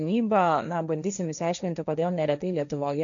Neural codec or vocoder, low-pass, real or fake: codec, 16 kHz, 1.1 kbps, Voila-Tokenizer; 7.2 kHz; fake